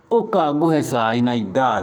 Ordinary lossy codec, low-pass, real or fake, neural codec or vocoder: none; none; fake; codec, 44.1 kHz, 2.6 kbps, SNAC